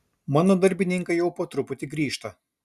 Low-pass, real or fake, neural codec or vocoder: 14.4 kHz; real; none